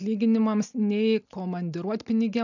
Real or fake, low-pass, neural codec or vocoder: real; 7.2 kHz; none